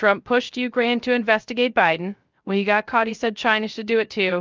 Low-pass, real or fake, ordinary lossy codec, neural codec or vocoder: 7.2 kHz; fake; Opus, 24 kbps; codec, 16 kHz, 0.3 kbps, FocalCodec